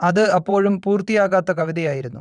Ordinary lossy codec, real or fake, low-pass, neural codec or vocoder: none; fake; 9.9 kHz; vocoder, 22.05 kHz, 80 mel bands, WaveNeXt